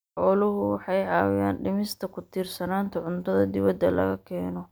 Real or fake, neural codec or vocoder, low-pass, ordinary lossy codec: real; none; none; none